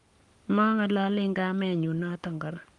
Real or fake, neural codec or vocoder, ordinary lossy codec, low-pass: fake; codec, 44.1 kHz, 7.8 kbps, Pupu-Codec; Opus, 32 kbps; 10.8 kHz